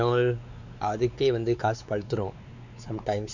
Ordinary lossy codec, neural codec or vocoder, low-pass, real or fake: none; codec, 16 kHz, 4 kbps, X-Codec, WavLM features, trained on Multilingual LibriSpeech; 7.2 kHz; fake